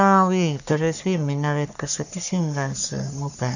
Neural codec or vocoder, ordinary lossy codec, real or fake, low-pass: codec, 44.1 kHz, 3.4 kbps, Pupu-Codec; none; fake; 7.2 kHz